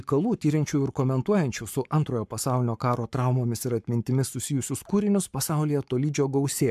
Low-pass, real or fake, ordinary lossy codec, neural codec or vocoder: 14.4 kHz; fake; MP3, 96 kbps; codec, 44.1 kHz, 7.8 kbps, Pupu-Codec